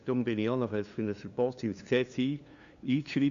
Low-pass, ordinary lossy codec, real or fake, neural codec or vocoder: 7.2 kHz; Opus, 64 kbps; fake; codec, 16 kHz, 2 kbps, FunCodec, trained on LibriTTS, 25 frames a second